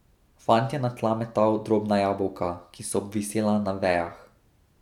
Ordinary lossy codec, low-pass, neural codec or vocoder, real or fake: none; 19.8 kHz; none; real